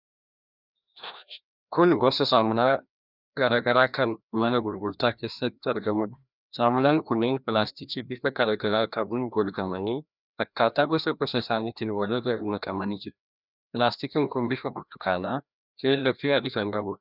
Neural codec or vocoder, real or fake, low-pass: codec, 16 kHz, 1 kbps, FreqCodec, larger model; fake; 5.4 kHz